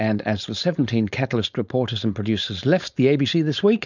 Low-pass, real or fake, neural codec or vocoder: 7.2 kHz; fake; codec, 16 kHz, 4.8 kbps, FACodec